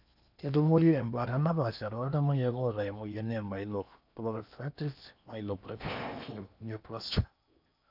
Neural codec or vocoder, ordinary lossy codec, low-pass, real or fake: codec, 16 kHz in and 24 kHz out, 0.8 kbps, FocalCodec, streaming, 65536 codes; none; 5.4 kHz; fake